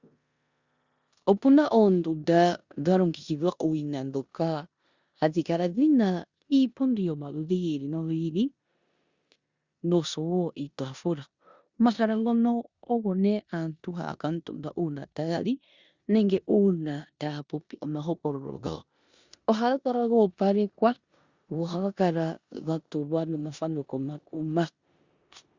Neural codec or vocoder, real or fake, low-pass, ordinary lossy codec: codec, 16 kHz in and 24 kHz out, 0.9 kbps, LongCat-Audio-Codec, four codebook decoder; fake; 7.2 kHz; Opus, 64 kbps